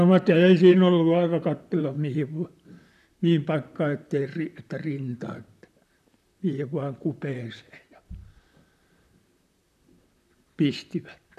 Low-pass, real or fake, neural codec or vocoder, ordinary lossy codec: 14.4 kHz; fake; vocoder, 44.1 kHz, 128 mel bands, Pupu-Vocoder; none